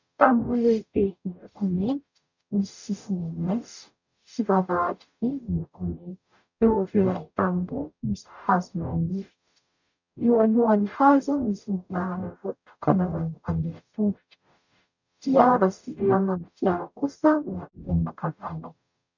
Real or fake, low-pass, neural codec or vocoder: fake; 7.2 kHz; codec, 44.1 kHz, 0.9 kbps, DAC